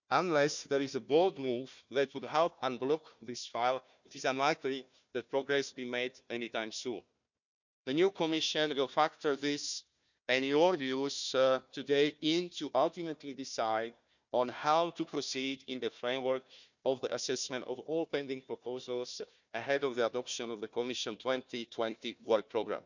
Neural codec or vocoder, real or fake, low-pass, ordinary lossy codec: codec, 16 kHz, 1 kbps, FunCodec, trained on Chinese and English, 50 frames a second; fake; 7.2 kHz; none